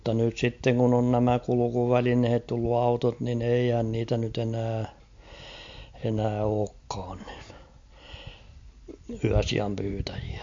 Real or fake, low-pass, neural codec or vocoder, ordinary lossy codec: real; 7.2 kHz; none; MP3, 48 kbps